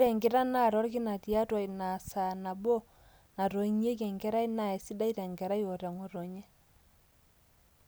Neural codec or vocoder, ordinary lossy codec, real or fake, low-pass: none; none; real; none